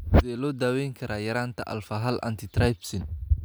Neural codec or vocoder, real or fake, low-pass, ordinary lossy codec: none; real; none; none